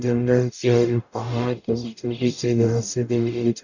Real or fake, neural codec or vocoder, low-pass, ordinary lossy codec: fake; codec, 44.1 kHz, 0.9 kbps, DAC; 7.2 kHz; none